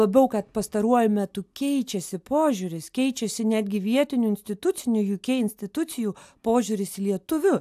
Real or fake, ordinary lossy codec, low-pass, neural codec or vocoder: real; AAC, 96 kbps; 14.4 kHz; none